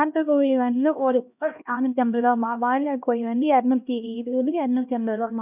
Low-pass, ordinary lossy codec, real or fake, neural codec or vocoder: 3.6 kHz; none; fake; codec, 16 kHz, 1 kbps, X-Codec, HuBERT features, trained on LibriSpeech